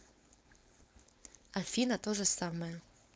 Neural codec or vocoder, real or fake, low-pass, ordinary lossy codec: codec, 16 kHz, 4.8 kbps, FACodec; fake; none; none